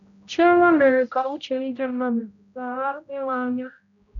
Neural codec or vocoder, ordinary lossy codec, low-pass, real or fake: codec, 16 kHz, 0.5 kbps, X-Codec, HuBERT features, trained on general audio; none; 7.2 kHz; fake